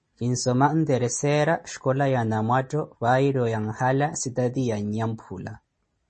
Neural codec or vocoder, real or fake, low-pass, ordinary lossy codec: none; real; 10.8 kHz; MP3, 32 kbps